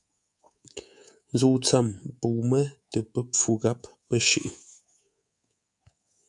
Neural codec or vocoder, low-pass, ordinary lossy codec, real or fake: codec, 24 kHz, 3.1 kbps, DualCodec; 10.8 kHz; AAC, 64 kbps; fake